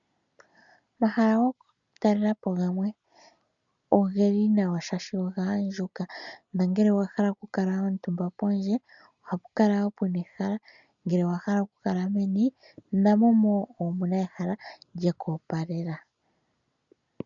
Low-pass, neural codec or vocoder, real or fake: 7.2 kHz; none; real